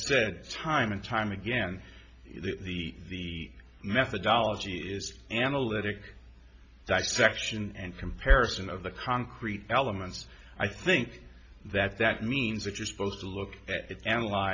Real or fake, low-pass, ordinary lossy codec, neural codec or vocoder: real; 7.2 kHz; MP3, 48 kbps; none